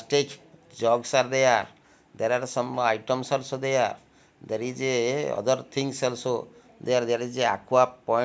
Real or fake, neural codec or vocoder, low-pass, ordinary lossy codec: real; none; none; none